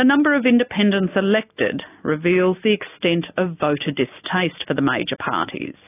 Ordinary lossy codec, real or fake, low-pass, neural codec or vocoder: AAC, 24 kbps; real; 3.6 kHz; none